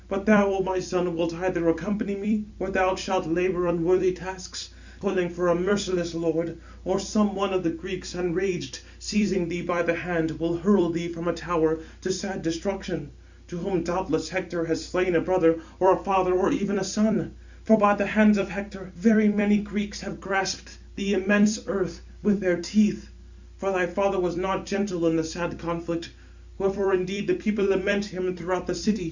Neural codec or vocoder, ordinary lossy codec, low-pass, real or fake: vocoder, 44.1 kHz, 128 mel bands every 256 samples, BigVGAN v2; Opus, 64 kbps; 7.2 kHz; fake